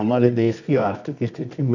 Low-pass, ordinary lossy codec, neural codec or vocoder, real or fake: 7.2 kHz; none; codec, 24 kHz, 0.9 kbps, WavTokenizer, medium music audio release; fake